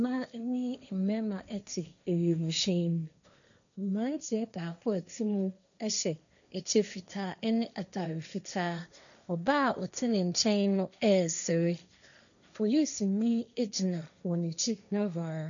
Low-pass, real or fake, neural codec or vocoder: 7.2 kHz; fake; codec, 16 kHz, 1.1 kbps, Voila-Tokenizer